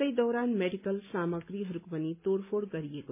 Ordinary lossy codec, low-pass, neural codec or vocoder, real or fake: MP3, 32 kbps; 3.6 kHz; none; real